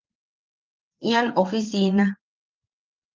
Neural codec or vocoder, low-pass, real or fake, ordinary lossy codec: vocoder, 22.05 kHz, 80 mel bands, WaveNeXt; 7.2 kHz; fake; Opus, 32 kbps